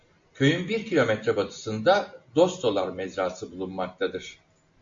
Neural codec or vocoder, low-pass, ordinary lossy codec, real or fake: none; 7.2 kHz; MP3, 48 kbps; real